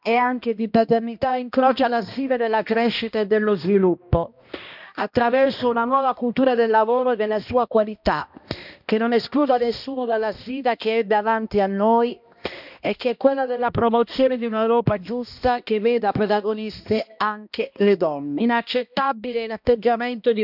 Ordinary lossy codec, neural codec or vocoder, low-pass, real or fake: none; codec, 16 kHz, 1 kbps, X-Codec, HuBERT features, trained on balanced general audio; 5.4 kHz; fake